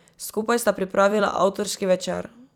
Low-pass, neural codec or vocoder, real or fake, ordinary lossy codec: 19.8 kHz; vocoder, 44.1 kHz, 128 mel bands every 256 samples, BigVGAN v2; fake; none